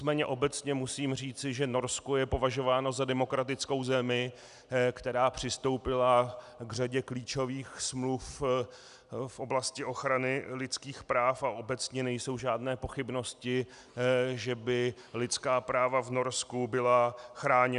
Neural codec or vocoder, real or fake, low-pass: none; real; 10.8 kHz